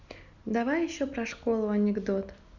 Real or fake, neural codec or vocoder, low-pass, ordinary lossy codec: real; none; 7.2 kHz; none